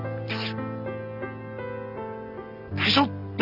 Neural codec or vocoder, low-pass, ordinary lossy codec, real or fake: none; 5.4 kHz; none; real